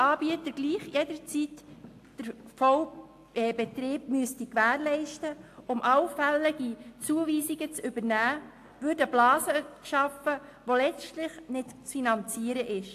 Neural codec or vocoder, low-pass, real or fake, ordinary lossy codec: none; 14.4 kHz; real; AAC, 64 kbps